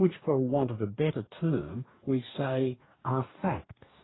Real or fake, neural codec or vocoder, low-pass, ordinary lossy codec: fake; codec, 44.1 kHz, 2.6 kbps, DAC; 7.2 kHz; AAC, 16 kbps